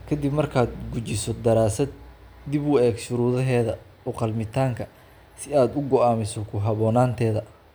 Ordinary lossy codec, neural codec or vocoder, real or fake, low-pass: none; none; real; none